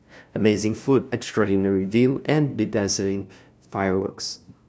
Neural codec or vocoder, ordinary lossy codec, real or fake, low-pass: codec, 16 kHz, 0.5 kbps, FunCodec, trained on LibriTTS, 25 frames a second; none; fake; none